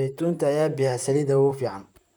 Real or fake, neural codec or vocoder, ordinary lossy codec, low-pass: fake; vocoder, 44.1 kHz, 128 mel bands, Pupu-Vocoder; none; none